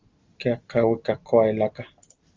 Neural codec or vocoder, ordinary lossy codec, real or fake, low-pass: none; Opus, 32 kbps; real; 7.2 kHz